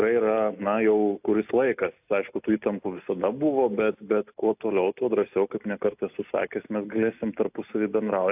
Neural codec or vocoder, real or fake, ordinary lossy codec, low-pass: none; real; AAC, 32 kbps; 3.6 kHz